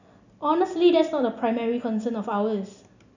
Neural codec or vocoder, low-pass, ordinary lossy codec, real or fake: none; 7.2 kHz; none; real